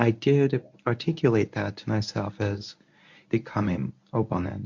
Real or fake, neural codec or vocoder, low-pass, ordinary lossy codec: fake; codec, 24 kHz, 0.9 kbps, WavTokenizer, medium speech release version 1; 7.2 kHz; MP3, 48 kbps